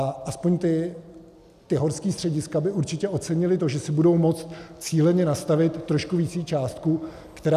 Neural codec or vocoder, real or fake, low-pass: none; real; 14.4 kHz